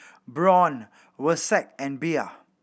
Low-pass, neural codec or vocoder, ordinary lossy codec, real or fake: none; none; none; real